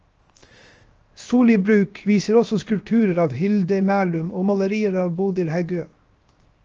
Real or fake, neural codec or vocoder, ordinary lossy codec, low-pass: fake; codec, 16 kHz, 0.7 kbps, FocalCodec; Opus, 24 kbps; 7.2 kHz